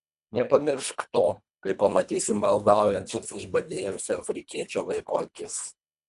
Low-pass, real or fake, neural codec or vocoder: 10.8 kHz; fake; codec, 24 kHz, 1.5 kbps, HILCodec